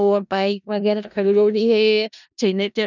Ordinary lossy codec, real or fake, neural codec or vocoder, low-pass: none; fake; codec, 16 kHz in and 24 kHz out, 0.4 kbps, LongCat-Audio-Codec, four codebook decoder; 7.2 kHz